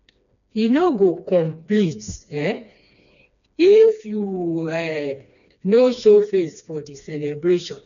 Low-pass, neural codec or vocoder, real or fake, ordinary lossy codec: 7.2 kHz; codec, 16 kHz, 2 kbps, FreqCodec, smaller model; fake; none